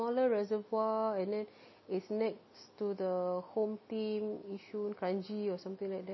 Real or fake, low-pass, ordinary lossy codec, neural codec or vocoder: real; 7.2 kHz; MP3, 24 kbps; none